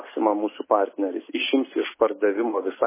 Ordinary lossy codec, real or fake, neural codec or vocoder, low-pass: MP3, 16 kbps; real; none; 3.6 kHz